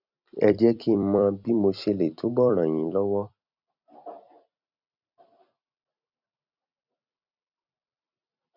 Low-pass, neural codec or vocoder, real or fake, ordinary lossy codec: 5.4 kHz; vocoder, 44.1 kHz, 128 mel bands every 256 samples, BigVGAN v2; fake; none